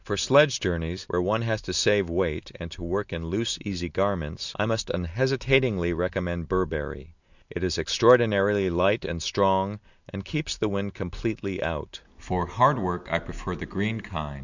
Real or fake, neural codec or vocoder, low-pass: real; none; 7.2 kHz